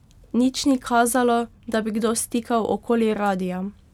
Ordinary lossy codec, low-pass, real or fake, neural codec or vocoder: none; 19.8 kHz; real; none